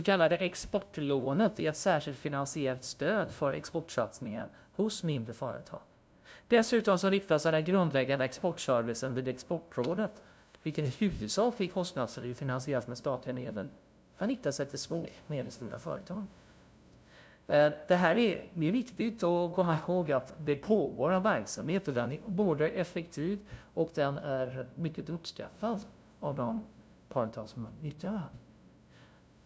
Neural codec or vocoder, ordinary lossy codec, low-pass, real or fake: codec, 16 kHz, 0.5 kbps, FunCodec, trained on LibriTTS, 25 frames a second; none; none; fake